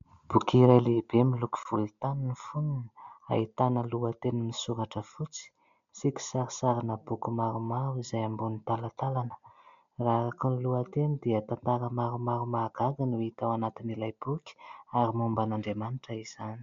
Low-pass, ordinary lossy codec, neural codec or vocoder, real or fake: 7.2 kHz; MP3, 64 kbps; none; real